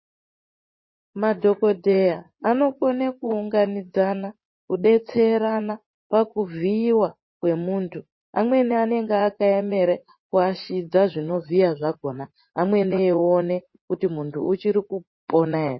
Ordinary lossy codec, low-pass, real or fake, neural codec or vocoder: MP3, 24 kbps; 7.2 kHz; fake; vocoder, 44.1 kHz, 80 mel bands, Vocos